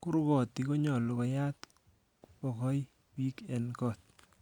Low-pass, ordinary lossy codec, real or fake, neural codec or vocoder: 19.8 kHz; none; real; none